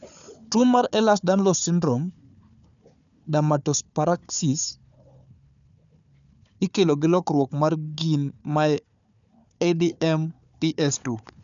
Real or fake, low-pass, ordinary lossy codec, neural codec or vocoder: fake; 7.2 kHz; none; codec, 16 kHz, 4 kbps, FunCodec, trained on Chinese and English, 50 frames a second